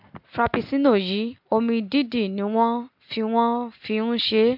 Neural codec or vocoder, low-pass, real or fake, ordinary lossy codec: none; 5.4 kHz; real; MP3, 48 kbps